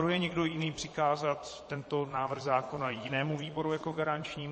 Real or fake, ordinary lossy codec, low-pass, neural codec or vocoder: fake; MP3, 32 kbps; 9.9 kHz; vocoder, 22.05 kHz, 80 mel bands, Vocos